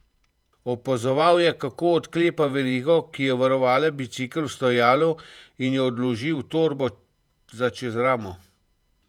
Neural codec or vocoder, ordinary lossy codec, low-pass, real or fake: vocoder, 48 kHz, 128 mel bands, Vocos; none; 19.8 kHz; fake